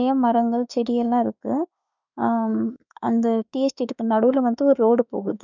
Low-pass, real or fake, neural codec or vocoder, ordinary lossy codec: 7.2 kHz; fake; autoencoder, 48 kHz, 32 numbers a frame, DAC-VAE, trained on Japanese speech; none